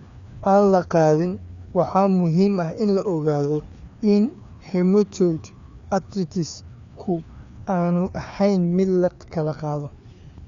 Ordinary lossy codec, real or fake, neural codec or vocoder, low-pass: Opus, 64 kbps; fake; codec, 16 kHz, 2 kbps, FreqCodec, larger model; 7.2 kHz